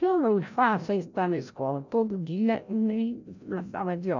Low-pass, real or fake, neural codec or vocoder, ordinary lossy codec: 7.2 kHz; fake; codec, 16 kHz, 0.5 kbps, FreqCodec, larger model; none